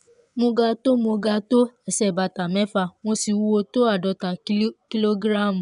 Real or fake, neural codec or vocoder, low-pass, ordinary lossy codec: fake; vocoder, 24 kHz, 100 mel bands, Vocos; 10.8 kHz; none